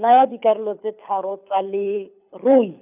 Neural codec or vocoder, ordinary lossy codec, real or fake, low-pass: codec, 24 kHz, 6 kbps, HILCodec; none; fake; 3.6 kHz